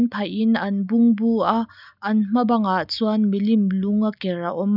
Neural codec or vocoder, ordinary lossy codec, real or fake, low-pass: none; none; real; 5.4 kHz